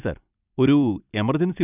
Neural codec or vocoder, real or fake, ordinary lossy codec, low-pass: vocoder, 44.1 kHz, 128 mel bands every 256 samples, BigVGAN v2; fake; none; 3.6 kHz